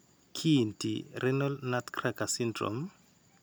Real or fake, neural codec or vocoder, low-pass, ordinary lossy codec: real; none; none; none